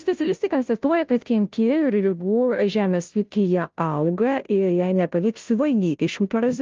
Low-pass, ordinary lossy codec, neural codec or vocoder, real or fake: 7.2 kHz; Opus, 24 kbps; codec, 16 kHz, 0.5 kbps, FunCodec, trained on Chinese and English, 25 frames a second; fake